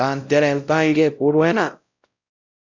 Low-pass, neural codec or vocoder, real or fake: 7.2 kHz; codec, 16 kHz, 0.5 kbps, X-Codec, WavLM features, trained on Multilingual LibriSpeech; fake